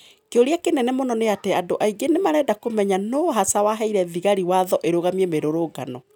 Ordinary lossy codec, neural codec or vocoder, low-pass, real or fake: none; none; 19.8 kHz; real